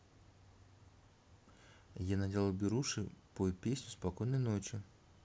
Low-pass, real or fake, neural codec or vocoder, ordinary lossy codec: none; real; none; none